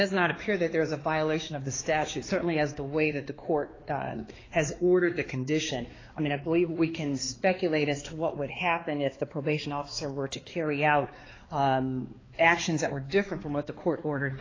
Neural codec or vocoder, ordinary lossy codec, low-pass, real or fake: codec, 16 kHz, 2 kbps, X-Codec, HuBERT features, trained on balanced general audio; AAC, 32 kbps; 7.2 kHz; fake